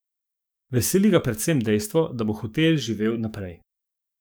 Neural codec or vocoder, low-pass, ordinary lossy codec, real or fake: codec, 44.1 kHz, 7.8 kbps, DAC; none; none; fake